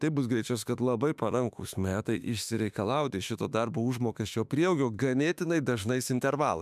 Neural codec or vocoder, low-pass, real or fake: autoencoder, 48 kHz, 32 numbers a frame, DAC-VAE, trained on Japanese speech; 14.4 kHz; fake